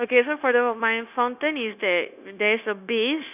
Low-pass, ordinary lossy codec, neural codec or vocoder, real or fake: 3.6 kHz; none; codec, 16 kHz, 0.9 kbps, LongCat-Audio-Codec; fake